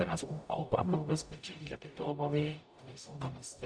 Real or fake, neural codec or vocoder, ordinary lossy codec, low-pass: fake; codec, 44.1 kHz, 0.9 kbps, DAC; AAC, 64 kbps; 9.9 kHz